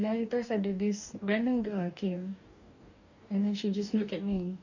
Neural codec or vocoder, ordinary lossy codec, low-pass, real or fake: codec, 44.1 kHz, 2.6 kbps, DAC; none; 7.2 kHz; fake